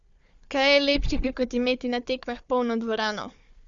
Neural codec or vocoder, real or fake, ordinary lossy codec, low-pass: codec, 16 kHz, 4 kbps, FunCodec, trained on Chinese and English, 50 frames a second; fake; Opus, 64 kbps; 7.2 kHz